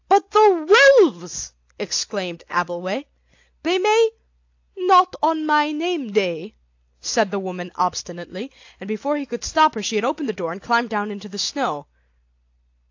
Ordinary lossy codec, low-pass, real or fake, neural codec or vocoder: AAC, 48 kbps; 7.2 kHz; real; none